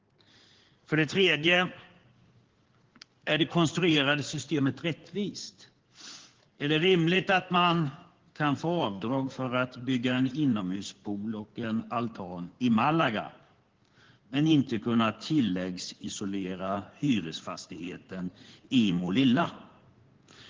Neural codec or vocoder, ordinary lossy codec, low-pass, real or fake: codec, 16 kHz in and 24 kHz out, 2.2 kbps, FireRedTTS-2 codec; Opus, 16 kbps; 7.2 kHz; fake